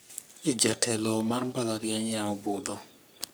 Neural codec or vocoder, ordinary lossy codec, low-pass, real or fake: codec, 44.1 kHz, 3.4 kbps, Pupu-Codec; none; none; fake